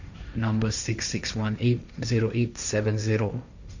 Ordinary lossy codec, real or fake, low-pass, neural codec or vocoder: none; fake; 7.2 kHz; codec, 16 kHz, 1.1 kbps, Voila-Tokenizer